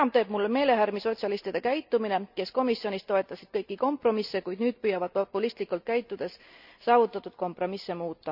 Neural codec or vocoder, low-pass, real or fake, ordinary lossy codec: none; 5.4 kHz; real; none